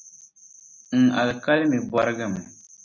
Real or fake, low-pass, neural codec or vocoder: real; 7.2 kHz; none